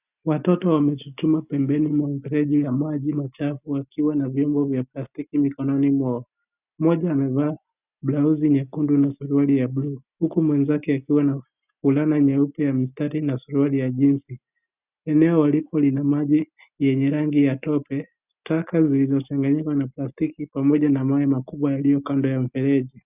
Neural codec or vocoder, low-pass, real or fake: none; 3.6 kHz; real